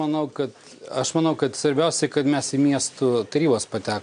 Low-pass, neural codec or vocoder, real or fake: 9.9 kHz; none; real